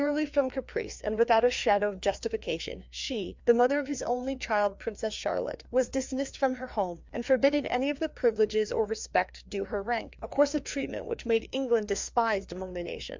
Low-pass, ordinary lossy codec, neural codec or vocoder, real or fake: 7.2 kHz; MP3, 64 kbps; codec, 16 kHz, 2 kbps, FreqCodec, larger model; fake